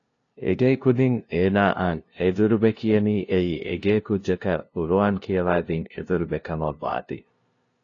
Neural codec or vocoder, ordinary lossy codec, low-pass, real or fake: codec, 16 kHz, 0.5 kbps, FunCodec, trained on LibriTTS, 25 frames a second; AAC, 32 kbps; 7.2 kHz; fake